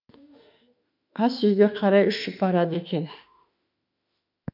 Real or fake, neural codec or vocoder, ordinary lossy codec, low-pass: fake; autoencoder, 48 kHz, 32 numbers a frame, DAC-VAE, trained on Japanese speech; none; 5.4 kHz